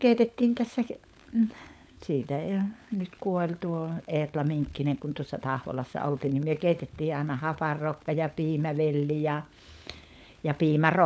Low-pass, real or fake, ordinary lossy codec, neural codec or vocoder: none; fake; none; codec, 16 kHz, 16 kbps, FunCodec, trained on LibriTTS, 50 frames a second